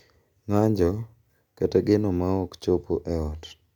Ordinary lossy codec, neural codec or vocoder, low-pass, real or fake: none; none; 19.8 kHz; real